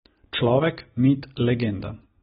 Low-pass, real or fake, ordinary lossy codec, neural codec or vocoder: 19.8 kHz; real; AAC, 16 kbps; none